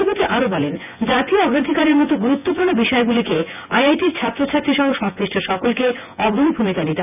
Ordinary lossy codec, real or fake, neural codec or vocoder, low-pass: none; fake; vocoder, 24 kHz, 100 mel bands, Vocos; 3.6 kHz